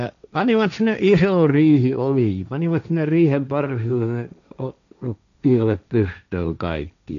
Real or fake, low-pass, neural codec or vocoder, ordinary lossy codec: fake; 7.2 kHz; codec, 16 kHz, 1.1 kbps, Voila-Tokenizer; none